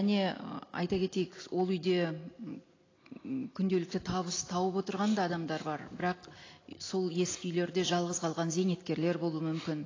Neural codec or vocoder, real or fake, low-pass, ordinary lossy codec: none; real; 7.2 kHz; AAC, 32 kbps